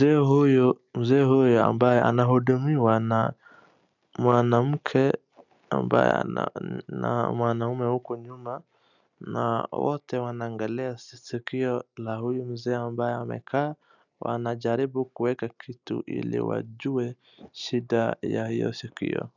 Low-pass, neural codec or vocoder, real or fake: 7.2 kHz; none; real